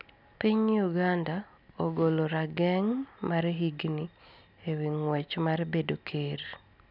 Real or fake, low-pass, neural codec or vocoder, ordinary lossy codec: real; 5.4 kHz; none; none